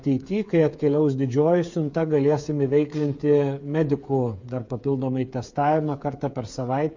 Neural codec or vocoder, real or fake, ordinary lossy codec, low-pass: codec, 16 kHz, 8 kbps, FreqCodec, smaller model; fake; MP3, 48 kbps; 7.2 kHz